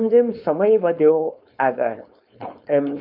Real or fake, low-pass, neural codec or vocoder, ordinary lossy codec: fake; 5.4 kHz; codec, 16 kHz, 4.8 kbps, FACodec; none